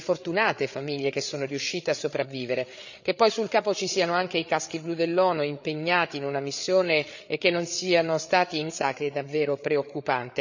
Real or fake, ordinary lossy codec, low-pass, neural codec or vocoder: fake; none; 7.2 kHz; codec, 16 kHz, 8 kbps, FreqCodec, larger model